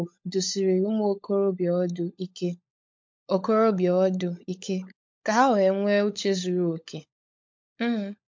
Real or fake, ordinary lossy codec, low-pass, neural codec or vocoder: fake; MP3, 48 kbps; 7.2 kHz; codec, 16 kHz, 16 kbps, FunCodec, trained on LibriTTS, 50 frames a second